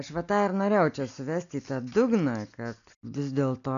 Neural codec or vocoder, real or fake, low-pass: none; real; 7.2 kHz